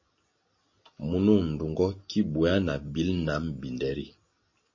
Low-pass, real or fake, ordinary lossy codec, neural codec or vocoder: 7.2 kHz; real; MP3, 32 kbps; none